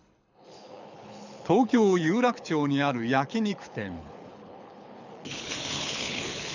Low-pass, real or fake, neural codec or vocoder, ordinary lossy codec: 7.2 kHz; fake; codec, 24 kHz, 6 kbps, HILCodec; none